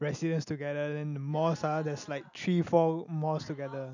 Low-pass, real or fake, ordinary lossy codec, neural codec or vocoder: 7.2 kHz; real; none; none